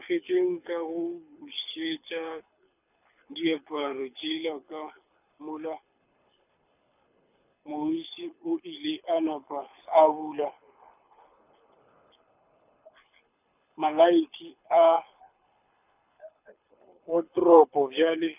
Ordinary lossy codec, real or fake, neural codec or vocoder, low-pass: none; fake; codec, 24 kHz, 6 kbps, HILCodec; 3.6 kHz